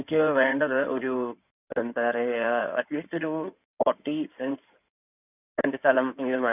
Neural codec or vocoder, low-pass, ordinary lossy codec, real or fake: codec, 16 kHz in and 24 kHz out, 2.2 kbps, FireRedTTS-2 codec; 3.6 kHz; none; fake